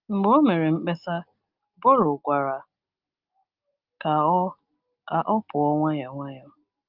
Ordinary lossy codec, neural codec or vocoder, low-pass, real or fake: Opus, 32 kbps; none; 5.4 kHz; real